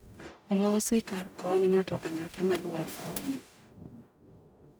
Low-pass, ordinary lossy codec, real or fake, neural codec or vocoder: none; none; fake; codec, 44.1 kHz, 0.9 kbps, DAC